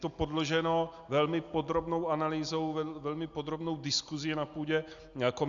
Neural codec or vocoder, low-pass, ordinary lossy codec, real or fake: none; 7.2 kHz; Opus, 64 kbps; real